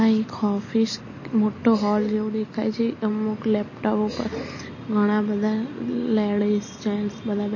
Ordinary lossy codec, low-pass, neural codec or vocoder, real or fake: MP3, 32 kbps; 7.2 kHz; none; real